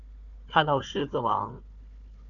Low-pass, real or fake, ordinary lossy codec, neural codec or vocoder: 7.2 kHz; fake; AAC, 64 kbps; codec, 16 kHz, 4 kbps, FunCodec, trained on Chinese and English, 50 frames a second